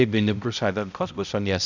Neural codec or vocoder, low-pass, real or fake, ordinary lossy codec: codec, 16 kHz, 0.5 kbps, X-Codec, HuBERT features, trained on balanced general audio; 7.2 kHz; fake; none